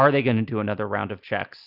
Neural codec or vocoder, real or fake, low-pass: none; real; 5.4 kHz